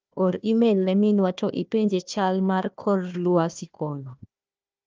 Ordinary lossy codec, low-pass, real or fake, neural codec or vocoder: Opus, 24 kbps; 7.2 kHz; fake; codec, 16 kHz, 1 kbps, FunCodec, trained on Chinese and English, 50 frames a second